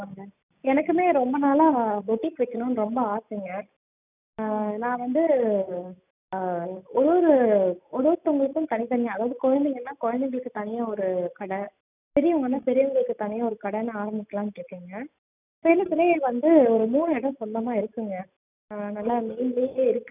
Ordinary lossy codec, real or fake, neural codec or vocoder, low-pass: none; real; none; 3.6 kHz